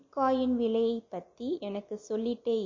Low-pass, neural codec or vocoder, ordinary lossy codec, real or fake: 7.2 kHz; none; MP3, 32 kbps; real